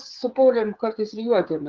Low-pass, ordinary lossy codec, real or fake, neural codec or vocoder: 7.2 kHz; Opus, 32 kbps; fake; codec, 16 kHz, 8 kbps, FreqCodec, smaller model